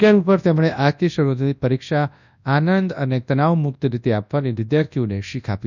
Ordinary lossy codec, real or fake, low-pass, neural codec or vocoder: none; fake; 7.2 kHz; codec, 24 kHz, 0.9 kbps, WavTokenizer, large speech release